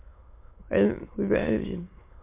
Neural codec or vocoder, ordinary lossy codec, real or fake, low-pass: autoencoder, 22.05 kHz, a latent of 192 numbers a frame, VITS, trained on many speakers; MP3, 24 kbps; fake; 3.6 kHz